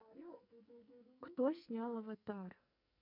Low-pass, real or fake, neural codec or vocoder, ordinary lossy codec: 5.4 kHz; fake; codec, 44.1 kHz, 2.6 kbps, SNAC; none